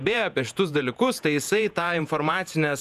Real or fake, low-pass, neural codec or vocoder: fake; 14.4 kHz; vocoder, 48 kHz, 128 mel bands, Vocos